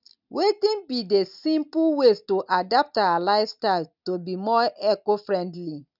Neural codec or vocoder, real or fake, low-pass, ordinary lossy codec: none; real; 5.4 kHz; none